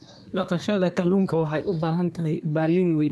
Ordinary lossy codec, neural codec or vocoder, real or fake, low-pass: none; codec, 24 kHz, 1 kbps, SNAC; fake; none